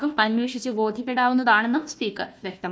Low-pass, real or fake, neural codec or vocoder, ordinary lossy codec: none; fake; codec, 16 kHz, 1 kbps, FunCodec, trained on Chinese and English, 50 frames a second; none